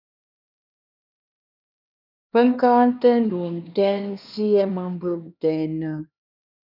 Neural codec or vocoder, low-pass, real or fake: codec, 16 kHz, 2 kbps, X-Codec, HuBERT features, trained on LibriSpeech; 5.4 kHz; fake